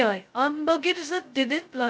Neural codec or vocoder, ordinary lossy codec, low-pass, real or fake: codec, 16 kHz, 0.2 kbps, FocalCodec; none; none; fake